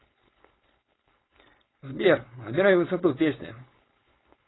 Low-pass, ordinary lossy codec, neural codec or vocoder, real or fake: 7.2 kHz; AAC, 16 kbps; codec, 16 kHz, 4.8 kbps, FACodec; fake